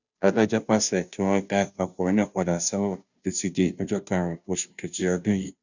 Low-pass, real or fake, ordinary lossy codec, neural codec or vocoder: 7.2 kHz; fake; none; codec, 16 kHz, 0.5 kbps, FunCodec, trained on Chinese and English, 25 frames a second